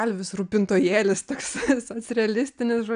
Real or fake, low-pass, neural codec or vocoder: real; 9.9 kHz; none